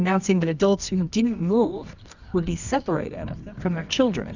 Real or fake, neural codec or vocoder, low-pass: fake; codec, 24 kHz, 0.9 kbps, WavTokenizer, medium music audio release; 7.2 kHz